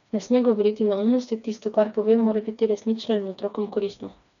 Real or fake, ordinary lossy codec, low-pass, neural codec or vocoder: fake; none; 7.2 kHz; codec, 16 kHz, 2 kbps, FreqCodec, smaller model